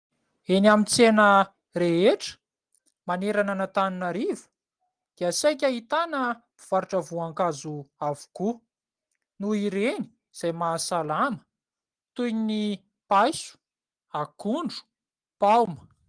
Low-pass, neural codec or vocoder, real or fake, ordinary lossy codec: 9.9 kHz; none; real; Opus, 24 kbps